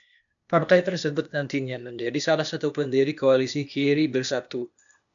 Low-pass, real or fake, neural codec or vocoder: 7.2 kHz; fake; codec, 16 kHz, 0.8 kbps, ZipCodec